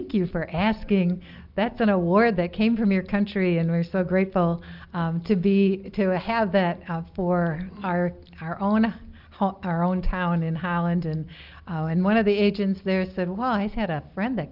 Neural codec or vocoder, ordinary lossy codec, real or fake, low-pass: none; Opus, 24 kbps; real; 5.4 kHz